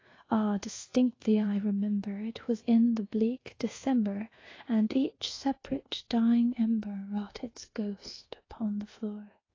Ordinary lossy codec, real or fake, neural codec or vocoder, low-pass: AAC, 32 kbps; fake; codec, 24 kHz, 1.2 kbps, DualCodec; 7.2 kHz